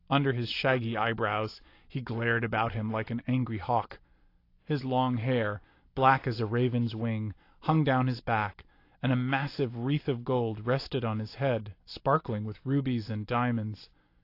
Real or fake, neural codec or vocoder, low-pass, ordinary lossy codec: real; none; 5.4 kHz; AAC, 32 kbps